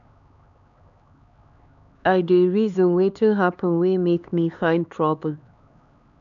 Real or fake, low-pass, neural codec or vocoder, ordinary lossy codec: fake; 7.2 kHz; codec, 16 kHz, 4 kbps, X-Codec, HuBERT features, trained on LibriSpeech; none